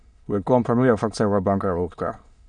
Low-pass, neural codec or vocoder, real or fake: 9.9 kHz; autoencoder, 22.05 kHz, a latent of 192 numbers a frame, VITS, trained on many speakers; fake